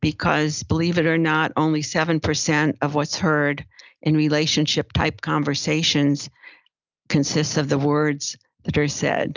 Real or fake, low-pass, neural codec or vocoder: real; 7.2 kHz; none